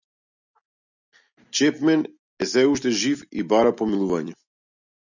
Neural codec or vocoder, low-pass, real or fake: none; 7.2 kHz; real